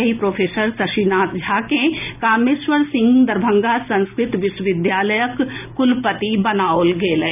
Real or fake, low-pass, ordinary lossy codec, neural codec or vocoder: real; 3.6 kHz; none; none